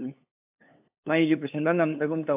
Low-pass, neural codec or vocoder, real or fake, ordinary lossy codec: 3.6 kHz; codec, 16 kHz, 2 kbps, FunCodec, trained on LibriTTS, 25 frames a second; fake; AAC, 24 kbps